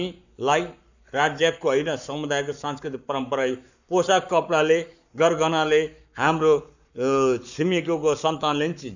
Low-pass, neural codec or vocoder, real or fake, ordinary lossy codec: 7.2 kHz; codec, 44.1 kHz, 7.8 kbps, Pupu-Codec; fake; none